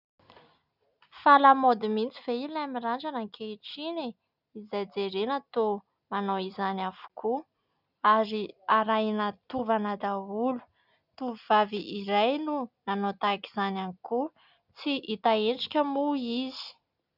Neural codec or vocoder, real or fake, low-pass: none; real; 5.4 kHz